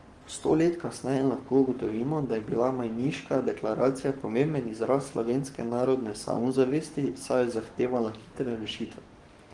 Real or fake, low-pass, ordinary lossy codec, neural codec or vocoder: fake; 10.8 kHz; Opus, 24 kbps; codec, 44.1 kHz, 7.8 kbps, Pupu-Codec